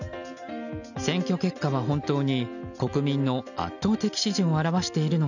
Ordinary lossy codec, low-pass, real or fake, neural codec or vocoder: none; 7.2 kHz; real; none